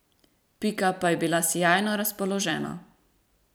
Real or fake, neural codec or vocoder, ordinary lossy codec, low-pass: fake; vocoder, 44.1 kHz, 128 mel bands every 256 samples, BigVGAN v2; none; none